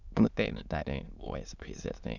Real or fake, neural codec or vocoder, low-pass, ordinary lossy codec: fake; autoencoder, 22.05 kHz, a latent of 192 numbers a frame, VITS, trained on many speakers; 7.2 kHz; none